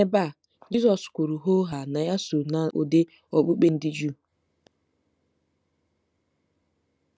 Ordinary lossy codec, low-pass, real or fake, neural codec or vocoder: none; none; real; none